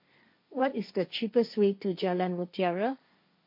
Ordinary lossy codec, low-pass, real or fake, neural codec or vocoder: MP3, 32 kbps; 5.4 kHz; fake; codec, 16 kHz, 1.1 kbps, Voila-Tokenizer